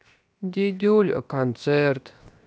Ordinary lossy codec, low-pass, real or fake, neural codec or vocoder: none; none; fake; codec, 16 kHz, 0.7 kbps, FocalCodec